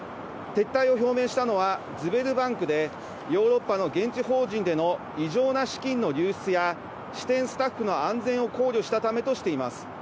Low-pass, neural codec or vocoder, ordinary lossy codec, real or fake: none; none; none; real